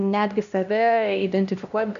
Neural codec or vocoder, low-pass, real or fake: codec, 16 kHz, 1 kbps, X-Codec, HuBERT features, trained on LibriSpeech; 7.2 kHz; fake